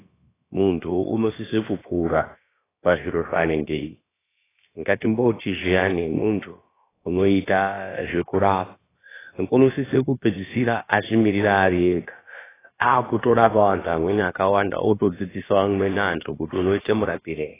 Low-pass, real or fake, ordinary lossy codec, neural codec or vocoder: 3.6 kHz; fake; AAC, 16 kbps; codec, 16 kHz, about 1 kbps, DyCAST, with the encoder's durations